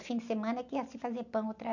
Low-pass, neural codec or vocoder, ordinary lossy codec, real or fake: 7.2 kHz; none; none; real